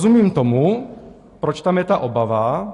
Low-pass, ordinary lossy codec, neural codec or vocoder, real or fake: 10.8 kHz; AAC, 48 kbps; none; real